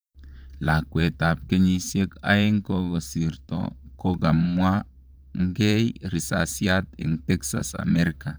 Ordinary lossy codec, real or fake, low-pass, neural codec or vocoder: none; fake; none; vocoder, 44.1 kHz, 128 mel bands, Pupu-Vocoder